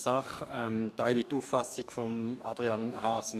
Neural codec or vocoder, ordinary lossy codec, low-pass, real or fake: codec, 44.1 kHz, 2.6 kbps, DAC; none; 14.4 kHz; fake